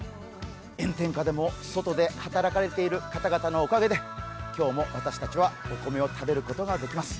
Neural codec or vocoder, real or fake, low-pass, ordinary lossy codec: none; real; none; none